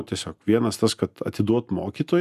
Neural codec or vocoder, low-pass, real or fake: none; 14.4 kHz; real